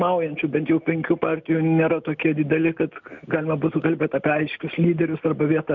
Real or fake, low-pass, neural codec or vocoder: real; 7.2 kHz; none